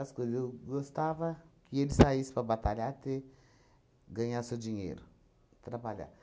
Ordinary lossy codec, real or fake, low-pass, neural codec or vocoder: none; real; none; none